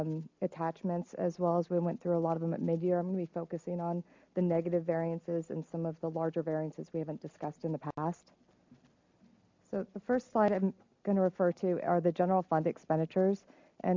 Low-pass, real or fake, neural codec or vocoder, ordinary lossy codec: 7.2 kHz; real; none; MP3, 64 kbps